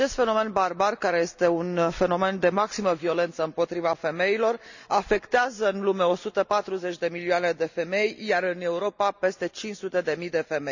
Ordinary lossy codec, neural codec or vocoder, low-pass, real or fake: none; none; 7.2 kHz; real